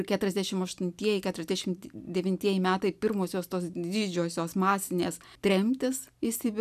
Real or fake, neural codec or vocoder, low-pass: real; none; 14.4 kHz